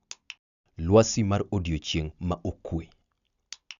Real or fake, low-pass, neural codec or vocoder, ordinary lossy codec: real; 7.2 kHz; none; MP3, 96 kbps